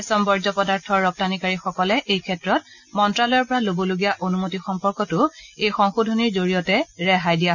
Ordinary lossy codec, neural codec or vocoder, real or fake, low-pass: none; none; real; 7.2 kHz